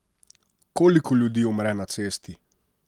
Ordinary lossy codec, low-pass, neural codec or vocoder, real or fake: Opus, 32 kbps; 19.8 kHz; none; real